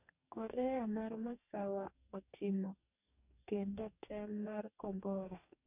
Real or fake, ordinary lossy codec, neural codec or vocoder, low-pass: fake; none; codec, 44.1 kHz, 2.6 kbps, DAC; 3.6 kHz